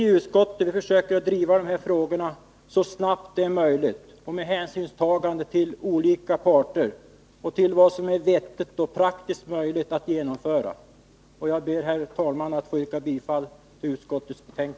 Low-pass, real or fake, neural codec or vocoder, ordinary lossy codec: none; real; none; none